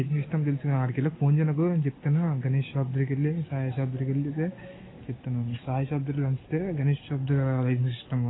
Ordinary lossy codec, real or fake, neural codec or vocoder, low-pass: AAC, 16 kbps; real; none; 7.2 kHz